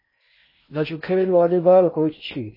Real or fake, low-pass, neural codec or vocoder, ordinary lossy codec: fake; 5.4 kHz; codec, 16 kHz in and 24 kHz out, 0.6 kbps, FocalCodec, streaming, 2048 codes; MP3, 24 kbps